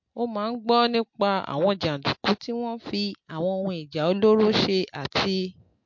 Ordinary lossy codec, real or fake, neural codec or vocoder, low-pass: MP3, 48 kbps; real; none; 7.2 kHz